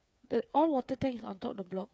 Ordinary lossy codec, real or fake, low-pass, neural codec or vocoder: none; fake; none; codec, 16 kHz, 8 kbps, FreqCodec, smaller model